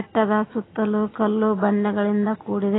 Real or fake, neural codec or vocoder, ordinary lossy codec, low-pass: real; none; AAC, 16 kbps; 7.2 kHz